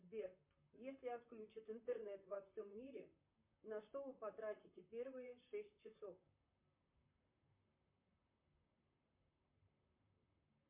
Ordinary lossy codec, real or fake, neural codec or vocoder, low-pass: MP3, 32 kbps; fake; vocoder, 44.1 kHz, 128 mel bands, Pupu-Vocoder; 3.6 kHz